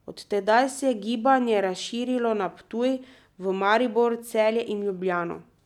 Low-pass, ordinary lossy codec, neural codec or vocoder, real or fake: 19.8 kHz; none; none; real